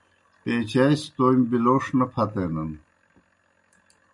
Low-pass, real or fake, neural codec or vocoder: 10.8 kHz; real; none